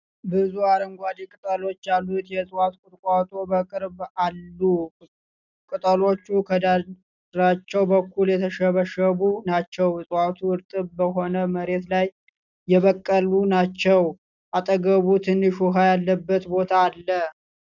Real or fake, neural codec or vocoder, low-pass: real; none; 7.2 kHz